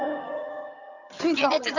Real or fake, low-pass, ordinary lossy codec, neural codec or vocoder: fake; 7.2 kHz; none; vocoder, 22.05 kHz, 80 mel bands, HiFi-GAN